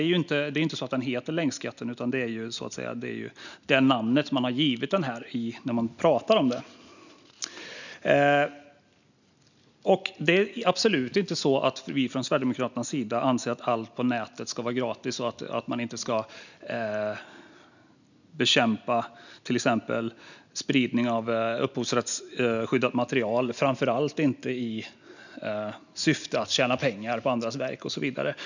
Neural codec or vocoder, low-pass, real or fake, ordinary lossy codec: none; 7.2 kHz; real; none